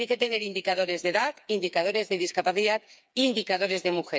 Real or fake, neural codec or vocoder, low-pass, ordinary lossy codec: fake; codec, 16 kHz, 4 kbps, FreqCodec, smaller model; none; none